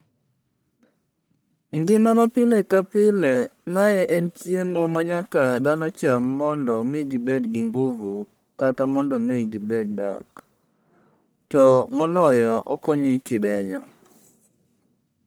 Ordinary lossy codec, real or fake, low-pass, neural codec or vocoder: none; fake; none; codec, 44.1 kHz, 1.7 kbps, Pupu-Codec